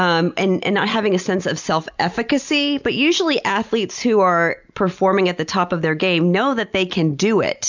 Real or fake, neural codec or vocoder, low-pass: real; none; 7.2 kHz